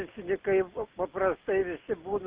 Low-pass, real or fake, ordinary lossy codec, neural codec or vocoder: 3.6 kHz; real; Opus, 32 kbps; none